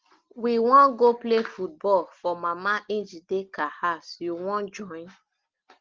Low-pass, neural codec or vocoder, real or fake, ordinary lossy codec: 7.2 kHz; none; real; Opus, 16 kbps